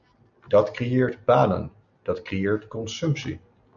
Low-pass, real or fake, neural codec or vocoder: 7.2 kHz; real; none